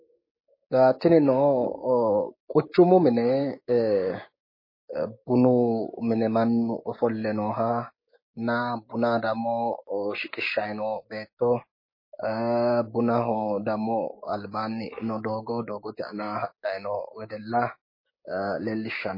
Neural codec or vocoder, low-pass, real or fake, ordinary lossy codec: codec, 16 kHz, 6 kbps, DAC; 5.4 kHz; fake; MP3, 24 kbps